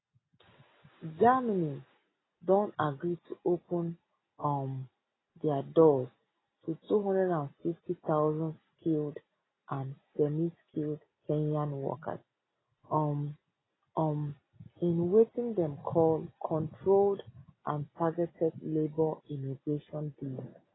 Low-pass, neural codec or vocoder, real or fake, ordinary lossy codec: 7.2 kHz; none; real; AAC, 16 kbps